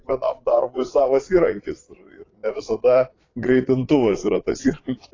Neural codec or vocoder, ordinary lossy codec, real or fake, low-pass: vocoder, 22.05 kHz, 80 mel bands, Vocos; AAC, 32 kbps; fake; 7.2 kHz